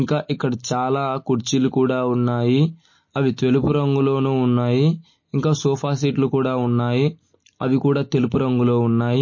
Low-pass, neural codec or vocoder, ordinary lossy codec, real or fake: 7.2 kHz; none; MP3, 32 kbps; real